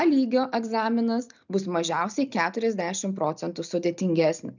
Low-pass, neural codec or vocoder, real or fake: 7.2 kHz; none; real